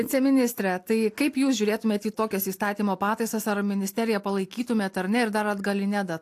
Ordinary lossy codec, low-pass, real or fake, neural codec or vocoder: AAC, 64 kbps; 14.4 kHz; real; none